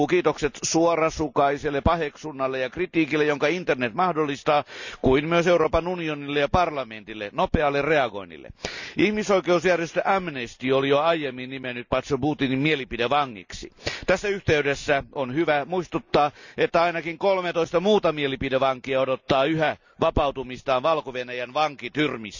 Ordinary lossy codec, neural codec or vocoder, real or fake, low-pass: none; none; real; 7.2 kHz